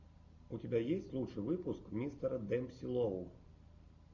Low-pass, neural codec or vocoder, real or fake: 7.2 kHz; none; real